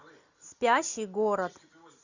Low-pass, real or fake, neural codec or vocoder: 7.2 kHz; real; none